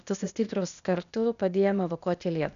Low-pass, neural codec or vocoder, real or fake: 7.2 kHz; codec, 16 kHz, 0.8 kbps, ZipCodec; fake